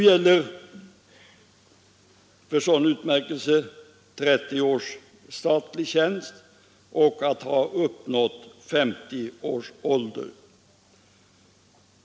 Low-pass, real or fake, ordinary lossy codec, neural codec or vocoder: none; real; none; none